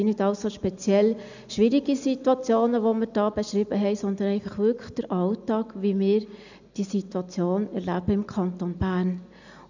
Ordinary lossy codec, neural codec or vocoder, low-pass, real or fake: none; none; 7.2 kHz; real